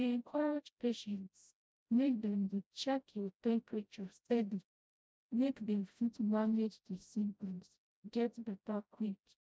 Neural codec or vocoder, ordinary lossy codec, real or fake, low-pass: codec, 16 kHz, 0.5 kbps, FreqCodec, smaller model; none; fake; none